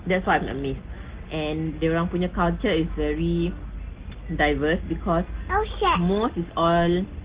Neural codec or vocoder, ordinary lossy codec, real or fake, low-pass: none; Opus, 16 kbps; real; 3.6 kHz